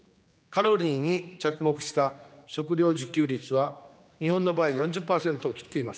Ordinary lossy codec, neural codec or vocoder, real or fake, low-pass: none; codec, 16 kHz, 2 kbps, X-Codec, HuBERT features, trained on general audio; fake; none